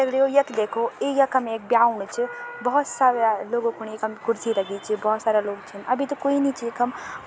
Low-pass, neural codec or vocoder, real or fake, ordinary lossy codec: none; none; real; none